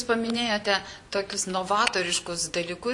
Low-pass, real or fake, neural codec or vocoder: 10.8 kHz; fake; vocoder, 24 kHz, 100 mel bands, Vocos